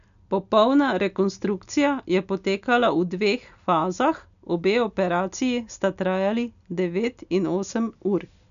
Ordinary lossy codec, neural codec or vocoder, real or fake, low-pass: none; none; real; 7.2 kHz